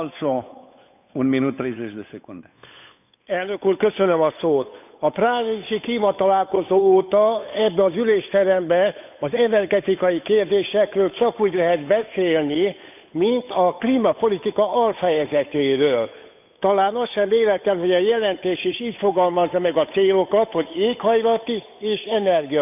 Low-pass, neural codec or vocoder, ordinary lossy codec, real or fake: 3.6 kHz; codec, 16 kHz, 8 kbps, FunCodec, trained on Chinese and English, 25 frames a second; none; fake